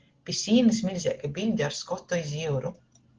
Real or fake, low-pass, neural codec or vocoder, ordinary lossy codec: real; 7.2 kHz; none; Opus, 16 kbps